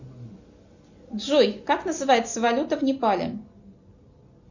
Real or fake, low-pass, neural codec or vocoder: real; 7.2 kHz; none